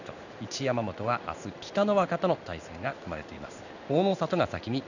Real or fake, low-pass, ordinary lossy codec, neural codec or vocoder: fake; 7.2 kHz; none; codec, 16 kHz in and 24 kHz out, 1 kbps, XY-Tokenizer